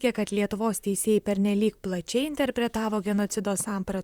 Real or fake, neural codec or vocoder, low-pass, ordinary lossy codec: fake; vocoder, 44.1 kHz, 128 mel bands, Pupu-Vocoder; 19.8 kHz; Opus, 64 kbps